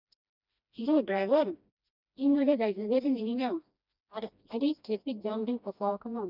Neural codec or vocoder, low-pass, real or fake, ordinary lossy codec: codec, 16 kHz, 1 kbps, FreqCodec, smaller model; 5.4 kHz; fake; none